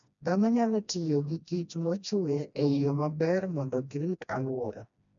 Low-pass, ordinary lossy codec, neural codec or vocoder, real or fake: 7.2 kHz; none; codec, 16 kHz, 1 kbps, FreqCodec, smaller model; fake